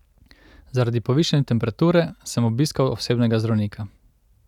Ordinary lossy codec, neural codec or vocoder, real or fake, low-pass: none; none; real; 19.8 kHz